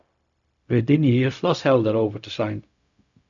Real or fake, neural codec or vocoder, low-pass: fake; codec, 16 kHz, 0.4 kbps, LongCat-Audio-Codec; 7.2 kHz